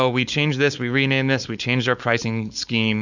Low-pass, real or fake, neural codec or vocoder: 7.2 kHz; fake; codec, 16 kHz, 4.8 kbps, FACodec